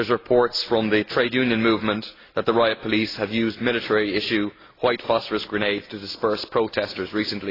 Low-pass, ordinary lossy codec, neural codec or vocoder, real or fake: 5.4 kHz; AAC, 24 kbps; none; real